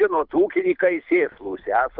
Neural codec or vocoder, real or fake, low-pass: codec, 24 kHz, 6 kbps, HILCodec; fake; 5.4 kHz